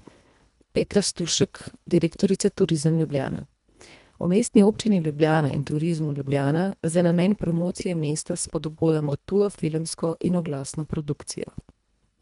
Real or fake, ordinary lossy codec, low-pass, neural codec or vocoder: fake; none; 10.8 kHz; codec, 24 kHz, 1.5 kbps, HILCodec